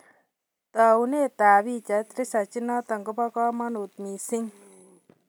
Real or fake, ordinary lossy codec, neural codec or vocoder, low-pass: real; none; none; none